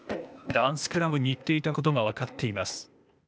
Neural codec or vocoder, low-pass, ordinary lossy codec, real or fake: codec, 16 kHz, 0.8 kbps, ZipCodec; none; none; fake